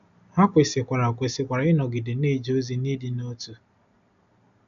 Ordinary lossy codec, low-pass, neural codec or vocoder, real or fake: none; 7.2 kHz; none; real